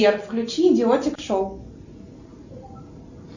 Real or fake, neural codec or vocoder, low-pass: real; none; 7.2 kHz